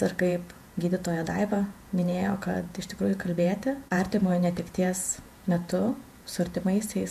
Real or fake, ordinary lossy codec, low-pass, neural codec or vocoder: fake; MP3, 64 kbps; 14.4 kHz; vocoder, 48 kHz, 128 mel bands, Vocos